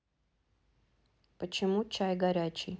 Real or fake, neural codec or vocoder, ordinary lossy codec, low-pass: real; none; none; none